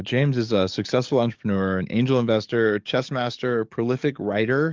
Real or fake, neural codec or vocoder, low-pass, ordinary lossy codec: fake; codec, 44.1 kHz, 7.8 kbps, DAC; 7.2 kHz; Opus, 32 kbps